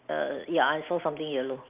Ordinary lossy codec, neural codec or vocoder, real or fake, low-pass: Opus, 32 kbps; none; real; 3.6 kHz